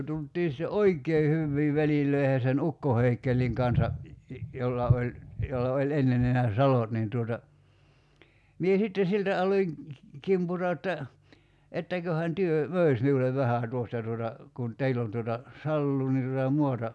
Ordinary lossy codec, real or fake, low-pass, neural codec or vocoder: none; real; none; none